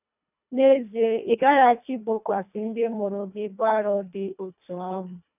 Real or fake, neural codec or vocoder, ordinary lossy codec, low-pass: fake; codec, 24 kHz, 1.5 kbps, HILCodec; none; 3.6 kHz